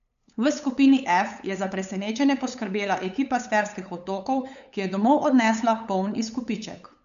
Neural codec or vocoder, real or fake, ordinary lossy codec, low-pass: codec, 16 kHz, 8 kbps, FunCodec, trained on LibriTTS, 25 frames a second; fake; AAC, 96 kbps; 7.2 kHz